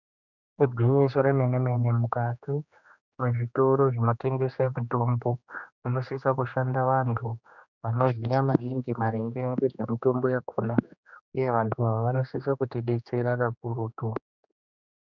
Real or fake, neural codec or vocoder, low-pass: fake; codec, 16 kHz, 2 kbps, X-Codec, HuBERT features, trained on general audio; 7.2 kHz